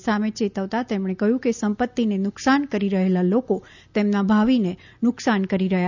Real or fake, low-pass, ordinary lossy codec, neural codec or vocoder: real; 7.2 kHz; none; none